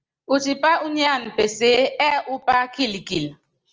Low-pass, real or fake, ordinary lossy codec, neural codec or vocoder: 7.2 kHz; real; Opus, 24 kbps; none